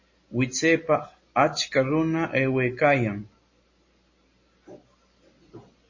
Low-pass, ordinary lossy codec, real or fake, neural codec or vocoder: 7.2 kHz; MP3, 32 kbps; real; none